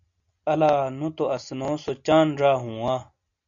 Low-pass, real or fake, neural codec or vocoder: 7.2 kHz; real; none